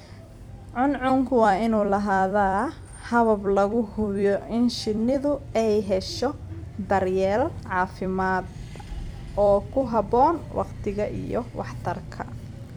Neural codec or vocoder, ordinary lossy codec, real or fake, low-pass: vocoder, 44.1 kHz, 128 mel bands every 256 samples, BigVGAN v2; none; fake; 19.8 kHz